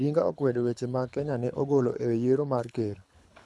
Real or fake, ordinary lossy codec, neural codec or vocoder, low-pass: fake; none; codec, 24 kHz, 6 kbps, HILCodec; none